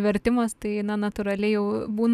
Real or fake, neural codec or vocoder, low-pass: real; none; 14.4 kHz